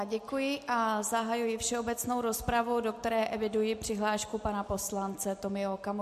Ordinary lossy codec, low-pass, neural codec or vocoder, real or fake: MP3, 64 kbps; 14.4 kHz; none; real